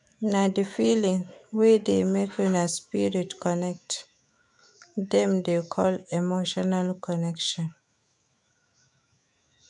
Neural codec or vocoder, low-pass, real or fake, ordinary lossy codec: autoencoder, 48 kHz, 128 numbers a frame, DAC-VAE, trained on Japanese speech; 10.8 kHz; fake; none